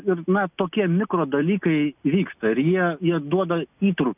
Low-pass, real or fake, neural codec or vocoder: 3.6 kHz; real; none